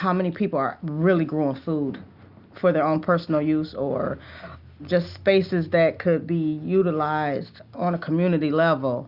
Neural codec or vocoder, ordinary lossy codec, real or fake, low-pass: none; Opus, 64 kbps; real; 5.4 kHz